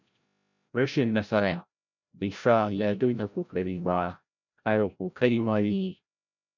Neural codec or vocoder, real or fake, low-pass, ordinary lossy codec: codec, 16 kHz, 0.5 kbps, FreqCodec, larger model; fake; 7.2 kHz; none